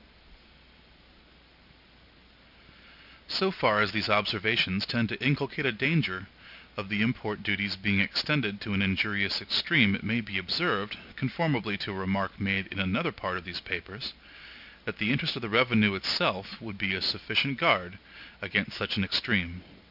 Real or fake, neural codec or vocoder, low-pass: real; none; 5.4 kHz